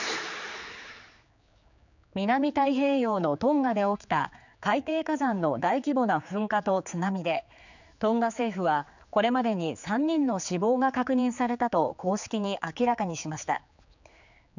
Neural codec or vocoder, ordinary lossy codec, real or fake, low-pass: codec, 16 kHz, 4 kbps, X-Codec, HuBERT features, trained on general audio; none; fake; 7.2 kHz